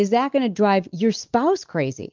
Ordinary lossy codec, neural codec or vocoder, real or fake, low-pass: Opus, 24 kbps; none; real; 7.2 kHz